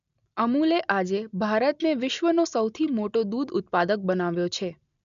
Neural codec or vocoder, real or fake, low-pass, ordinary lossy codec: none; real; 7.2 kHz; none